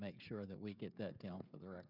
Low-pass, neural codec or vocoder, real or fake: 5.4 kHz; codec, 16 kHz, 16 kbps, FreqCodec, smaller model; fake